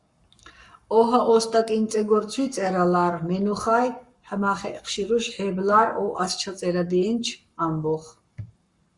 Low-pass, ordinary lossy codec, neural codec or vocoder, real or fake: 10.8 kHz; Opus, 64 kbps; codec, 44.1 kHz, 7.8 kbps, Pupu-Codec; fake